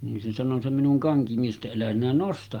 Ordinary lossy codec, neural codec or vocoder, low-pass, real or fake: Opus, 32 kbps; none; 19.8 kHz; real